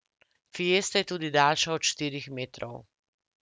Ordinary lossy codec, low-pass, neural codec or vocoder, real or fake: none; none; none; real